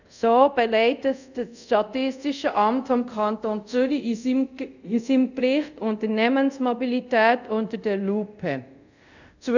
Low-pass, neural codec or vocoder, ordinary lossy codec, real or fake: 7.2 kHz; codec, 24 kHz, 0.5 kbps, DualCodec; none; fake